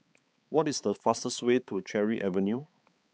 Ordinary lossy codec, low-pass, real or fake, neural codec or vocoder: none; none; fake; codec, 16 kHz, 4 kbps, X-Codec, HuBERT features, trained on balanced general audio